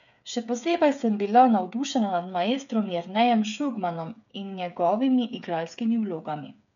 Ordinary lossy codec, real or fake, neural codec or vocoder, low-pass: none; fake; codec, 16 kHz, 8 kbps, FreqCodec, smaller model; 7.2 kHz